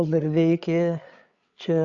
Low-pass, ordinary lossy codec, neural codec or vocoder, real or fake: 7.2 kHz; Opus, 64 kbps; codec, 16 kHz, 8 kbps, FreqCodec, larger model; fake